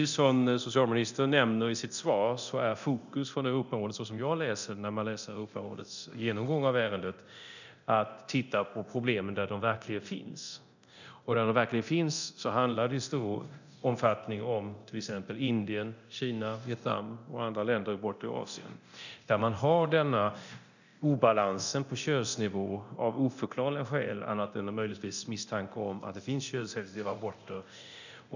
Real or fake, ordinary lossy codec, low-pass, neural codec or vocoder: fake; none; 7.2 kHz; codec, 24 kHz, 0.9 kbps, DualCodec